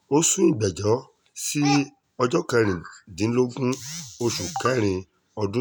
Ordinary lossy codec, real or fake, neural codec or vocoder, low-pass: none; real; none; none